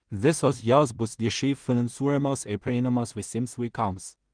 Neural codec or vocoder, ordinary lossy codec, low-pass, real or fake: codec, 16 kHz in and 24 kHz out, 0.4 kbps, LongCat-Audio-Codec, two codebook decoder; Opus, 24 kbps; 9.9 kHz; fake